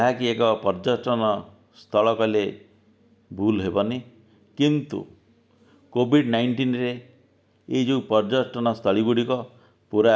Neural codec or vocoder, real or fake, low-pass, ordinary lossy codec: none; real; none; none